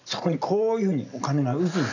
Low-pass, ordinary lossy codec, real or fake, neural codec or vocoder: 7.2 kHz; none; real; none